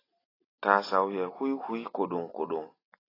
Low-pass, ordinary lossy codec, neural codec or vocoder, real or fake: 5.4 kHz; AAC, 24 kbps; none; real